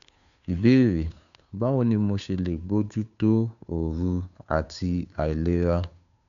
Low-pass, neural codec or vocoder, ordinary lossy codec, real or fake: 7.2 kHz; codec, 16 kHz, 2 kbps, FunCodec, trained on Chinese and English, 25 frames a second; none; fake